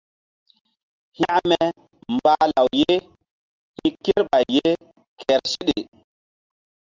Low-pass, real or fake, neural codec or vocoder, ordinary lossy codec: 7.2 kHz; real; none; Opus, 32 kbps